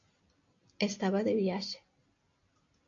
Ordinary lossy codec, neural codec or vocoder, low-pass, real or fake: AAC, 48 kbps; none; 7.2 kHz; real